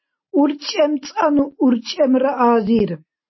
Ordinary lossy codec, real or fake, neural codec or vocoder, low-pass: MP3, 24 kbps; real; none; 7.2 kHz